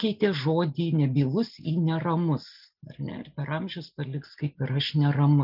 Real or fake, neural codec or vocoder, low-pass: real; none; 5.4 kHz